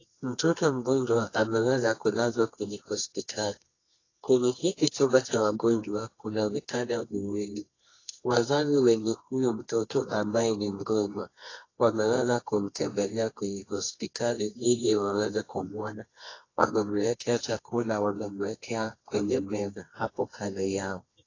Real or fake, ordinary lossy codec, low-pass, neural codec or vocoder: fake; AAC, 32 kbps; 7.2 kHz; codec, 24 kHz, 0.9 kbps, WavTokenizer, medium music audio release